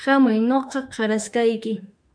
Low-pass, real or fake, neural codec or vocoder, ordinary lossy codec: 9.9 kHz; fake; autoencoder, 48 kHz, 32 numbers a frame, DAC-VAE, trained on Japanese speech; MP3, 96 kbps